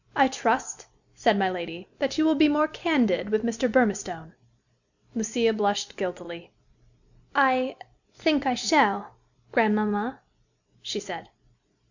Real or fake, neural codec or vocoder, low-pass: real; none; 7.2 kHz